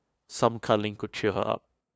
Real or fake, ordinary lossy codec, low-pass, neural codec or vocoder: fake; none; none; codec, 16 kHz, 2 kbps, FunCodec, trained on LibriTTS, 25 frames a second